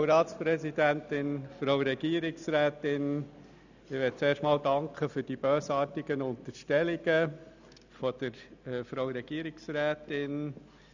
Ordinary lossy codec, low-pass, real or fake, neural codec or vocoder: none; 7.2 kHz; real; none